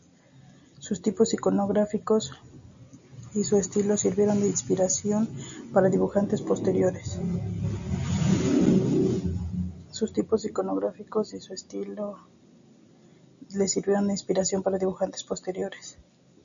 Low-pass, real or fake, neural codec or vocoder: 7.2 kHz; real; none